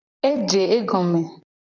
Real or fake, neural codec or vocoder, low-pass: fake; vocoder, 44.1 kHz, 128 mel bands, Pupu-Vocoder; 7.2 kHz